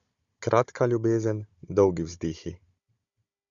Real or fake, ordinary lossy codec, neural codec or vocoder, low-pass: fake; Opus, 64 kbps; codec, 16 kHz, 16 kbps, FunCodec, trained on Chinese and English, 50 frames a second; 7.2 kHz